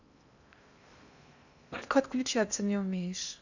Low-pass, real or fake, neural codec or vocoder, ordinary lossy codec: 7.2 kHz; fake; codec, 16 kHz in and 24 kHz out, 0.8 kbps, FocalCodec, streaming, 65536 codes; none